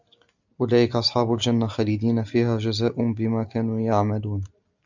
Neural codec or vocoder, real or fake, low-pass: none; real; 7.2 kHz